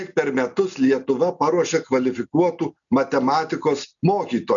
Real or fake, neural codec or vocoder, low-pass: real; none; 7.2 kHz